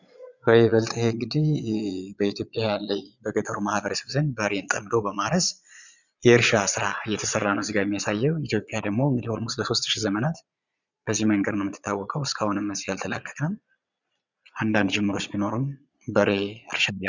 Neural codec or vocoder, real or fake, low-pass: vocoder, 22.05 kHz, 80 mel bands, Vocos; fake; 7.2 kHz